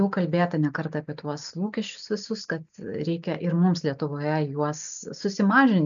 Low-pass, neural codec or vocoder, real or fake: 7.2 kHz; none; real